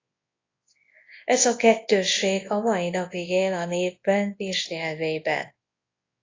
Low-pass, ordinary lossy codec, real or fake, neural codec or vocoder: 7.2 kHz; AAC, 32 kbps; fake; codec, 24 kHz, 0.9 kbps, WavTokenizer, large speech release